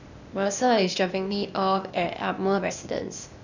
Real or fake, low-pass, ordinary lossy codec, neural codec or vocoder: fake; 7.2 kHz; none; codec, 16 kHz, 0.8 kbps, ZipCodec